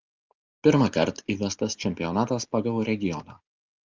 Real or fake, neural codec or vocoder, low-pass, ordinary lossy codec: real; none; 7.2 kHz; Opus, 32 kbps